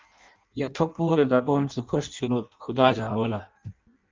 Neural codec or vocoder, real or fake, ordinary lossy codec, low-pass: codec, 16 kHz in and 24 kHz out, 0.6 kbps, FireRedTTS-2 codec; fake; Opus, 24 kbps; 7.2 kHz